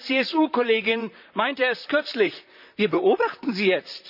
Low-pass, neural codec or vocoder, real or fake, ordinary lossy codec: 5.4 kHz; vocoder, 44.1 kHz, 128 mel bands, Pupu-Vocoder; fake; none